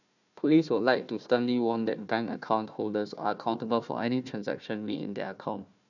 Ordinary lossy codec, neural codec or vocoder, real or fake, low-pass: none; codec, 16 kHz, 1 kbps, FunCodec, trained on Chinese and English, 50 frames a second; fake; 7.2 kHz